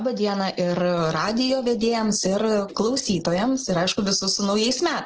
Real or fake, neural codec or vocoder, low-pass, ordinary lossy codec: real; none; 7.2 kHz; Opus, 16 kbps